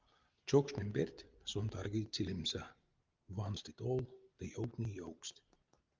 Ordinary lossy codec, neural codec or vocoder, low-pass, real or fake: Opus, 32 kbps; none; 7.2 kHz; real